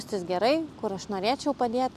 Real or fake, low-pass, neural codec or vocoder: real; 14.4 kHz; none